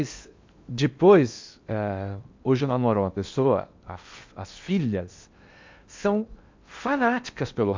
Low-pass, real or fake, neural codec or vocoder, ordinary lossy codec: 7.2 kHz; fake; codec, 16 kHz in and 24 kHz out, 0.8 kbps, FocalCodec, streaming, 65536 codes; MP3, 64 kbps